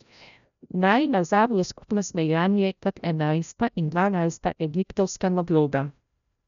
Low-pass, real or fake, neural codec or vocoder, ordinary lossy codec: 7.2 kHz; fake; codec, 16 kHz, 0.5 kbps, FreqCodec, larger model; none